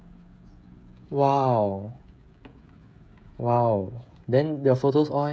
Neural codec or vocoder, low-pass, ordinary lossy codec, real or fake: codec, 16 kHz, 16 kbps, FreqCodec, smaller model; none; none; fake